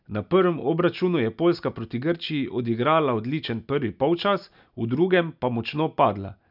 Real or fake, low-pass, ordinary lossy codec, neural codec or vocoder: real; 5.4 kHz; none; none